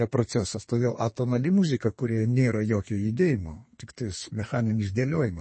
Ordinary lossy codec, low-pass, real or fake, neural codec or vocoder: MP3, 32 kbps; 9.9 kHz; fake; codec, 32 kHz, 1.9 kbps, SNAC